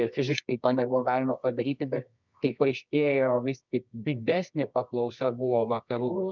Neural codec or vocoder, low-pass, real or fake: codec, 24 kHz, 0.9 kbps, WavTokenizer, medium music audio release; 7.2 kHz; fake